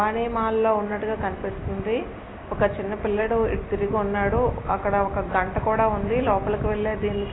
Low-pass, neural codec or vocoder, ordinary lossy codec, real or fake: 7.2 kHz; none; AAC, 16 kbps; real